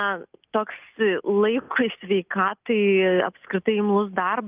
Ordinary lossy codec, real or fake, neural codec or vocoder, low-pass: Opus, 24 kbps; real; none; 3.6 kHz